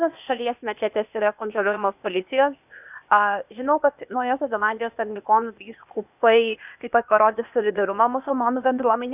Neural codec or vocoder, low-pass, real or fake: codec, 16 kHz, 0.8 kbps, ZipCodec; 3.6 kHz; fake